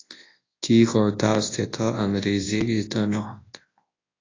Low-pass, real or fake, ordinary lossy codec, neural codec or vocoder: 7.2 kHz; fake; AAC, 32 kbps; codec, 24 kHz, 0.9 kbps, WavTokenizer, large speech release